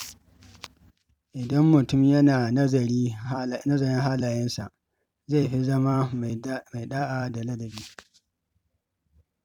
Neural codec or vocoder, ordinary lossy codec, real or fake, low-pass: vocoder, 44.1 kHz, 128 mel bands every 256 samples, BigVGAN v2; none; fake; 19.8 kHz